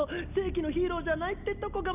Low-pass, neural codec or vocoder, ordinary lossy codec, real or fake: 3.6 kHz; none; none; real